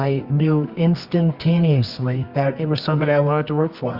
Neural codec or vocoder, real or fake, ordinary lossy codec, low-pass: codec, 24 kHz, 0.9 kbps, WavTokenizer, medium music audio release; fake; Opus, 64 kbps; 5.4 kHz